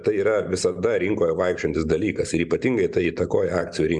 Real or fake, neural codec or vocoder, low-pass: real; none; 10.8 kHz